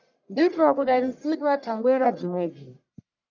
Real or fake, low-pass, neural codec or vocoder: fake; 7.2 kHz; codec, 44.1 kHz, 1.7 kbps, Pupu-Codec